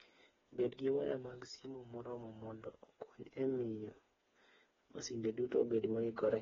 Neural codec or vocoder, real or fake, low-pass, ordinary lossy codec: codec, 16 kHz, 4 kbps, FreqCodec, smaller model; fake; 7.2 kHz; AAC, 24 kbps